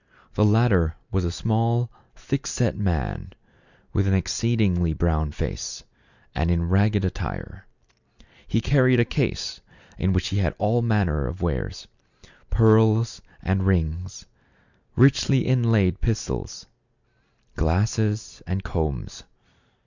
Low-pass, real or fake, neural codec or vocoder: 7.2 kHz; real; none